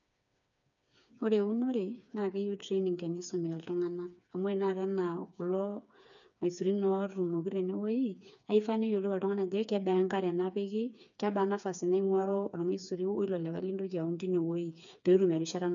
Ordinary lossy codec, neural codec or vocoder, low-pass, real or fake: none; codec, 16 kHz, 4 kbps, FreqCodec, smaller model; 7.2 kHz; fake